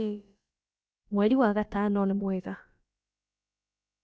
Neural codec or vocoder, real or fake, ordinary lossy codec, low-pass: codec, 16 kHz, about 1 kbps, DyCAST, with the encoder's durations; fake; none; none